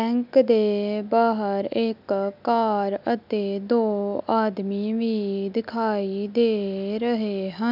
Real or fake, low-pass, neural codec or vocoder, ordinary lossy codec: real; 5.4 kHz; none; none